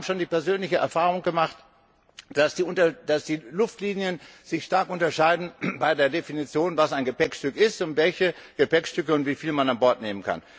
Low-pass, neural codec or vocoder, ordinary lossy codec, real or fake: none; none; none; real